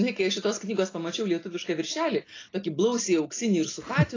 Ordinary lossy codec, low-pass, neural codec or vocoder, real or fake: AAC, 32 kbps; 7.2 kHz; none; real